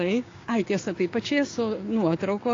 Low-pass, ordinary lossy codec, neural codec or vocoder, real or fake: 7.2 kHz; AAC, 32 kbps; codec, 16 kHz, 6 kbps, DAC; fake